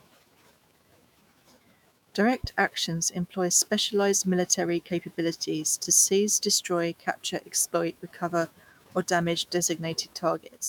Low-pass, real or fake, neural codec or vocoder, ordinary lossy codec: none; fake; autoencoder, 48 kHz, 128 numbers a frame, DAC-VAE, trained on Japanese speech; none